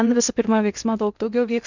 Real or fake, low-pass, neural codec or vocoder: fake; 7.2 kHz; codec, 16 kHz in and 24 kHz out, 0.6 kbps, FocalCodec, streaming, 2048 codes